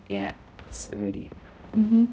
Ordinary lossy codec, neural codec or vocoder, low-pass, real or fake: none; codec, 16 kHz, 0.5 kbps, X-Codec, HuBERT features, trained on balanced general audio; none; fake